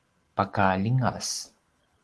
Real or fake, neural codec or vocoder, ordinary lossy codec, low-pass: real; none; Opus, 16 kbps; 10.8 kHz